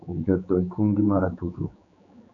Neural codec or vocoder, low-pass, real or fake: codec, 16 kHz, 4 kbps, X-Codec, HuBERT features, trained on general audio; 7.2 kHz; fake